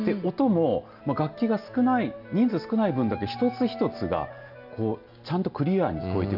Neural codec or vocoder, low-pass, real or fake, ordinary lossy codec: none; 5.4 kHz; real; none